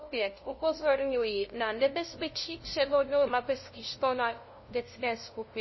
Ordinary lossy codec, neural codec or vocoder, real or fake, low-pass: MP3, 24 kbps; codec, 16 kHz, 0.5 kbps, FunCodec, trained on LibriTTS, 25 frames a second; fake; 7.2 kHz